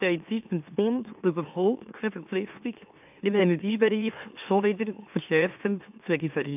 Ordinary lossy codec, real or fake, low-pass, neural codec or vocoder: AAC, 32 kbps; fake; 3.6 kHz; autoencoder, 44.1 kHz, a latent of 192 numbers a frame, MeloTTS